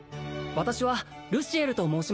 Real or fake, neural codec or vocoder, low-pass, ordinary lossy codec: real; none; none; none